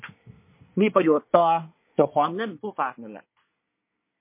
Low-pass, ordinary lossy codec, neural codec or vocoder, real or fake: 3.6 kHz; MP3, 24 kbps; codec, 24 kHz, 1 kbps, SNAC; fake